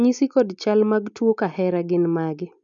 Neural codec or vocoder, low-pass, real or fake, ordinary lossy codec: none; 7.2 kHz; real; none